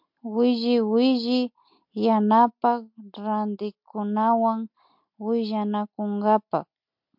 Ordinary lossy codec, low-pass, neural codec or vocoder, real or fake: MP3, 48 kbps; 5.4 kHz; none; real